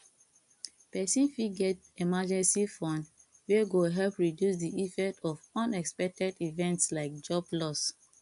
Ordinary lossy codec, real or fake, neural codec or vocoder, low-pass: AAC, 96 kbps; real; none; 10.8 kHz